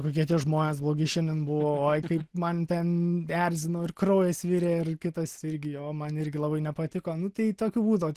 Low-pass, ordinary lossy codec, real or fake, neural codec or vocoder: 14.4 kHz; Opus, 16 kbps; real; none